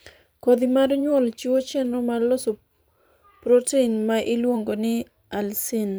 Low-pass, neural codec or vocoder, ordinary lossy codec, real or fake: none; vocoder, 44.1 kHz, 128 mel bands every 512 samples, BigVGAN v2; none; fake